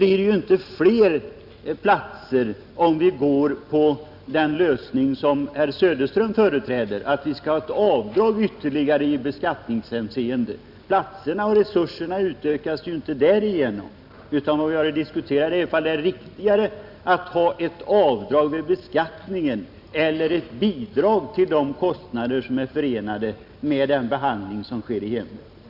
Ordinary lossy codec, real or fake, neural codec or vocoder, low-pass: none; real; none; 5.4 kHz